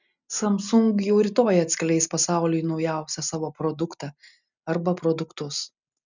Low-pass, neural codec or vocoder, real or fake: 7.2 kHz; none; real